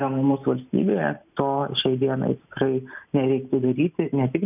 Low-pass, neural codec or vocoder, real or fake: 3.6 kHz; none; real